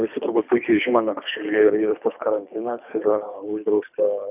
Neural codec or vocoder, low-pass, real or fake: codec, 24 kHz, 3 kbps, HILCodec; 3.6 kHz; fake